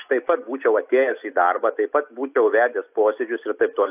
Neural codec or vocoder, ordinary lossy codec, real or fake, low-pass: none; AAC, 32 kbps; real; 3.6 kHz